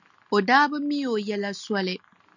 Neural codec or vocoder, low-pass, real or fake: none; 7.2 kHz; real